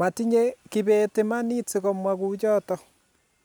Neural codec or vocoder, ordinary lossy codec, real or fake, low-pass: none; none; real; none